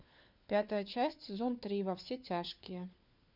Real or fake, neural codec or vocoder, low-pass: fake; codec, 16 kHz, 4 kbps, FunCodec, trained on LibriTTS, 50 frames a second; 5.4 kHz